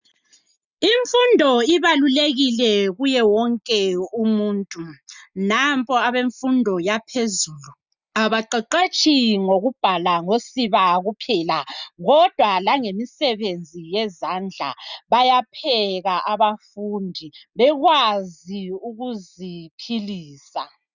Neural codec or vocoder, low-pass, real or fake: none; 7.2 kHz; real